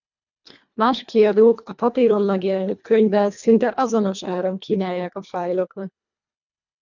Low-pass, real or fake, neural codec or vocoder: 7.2 kHz; fake; codec, 24 kHz, 1.5 kbps, HILCodec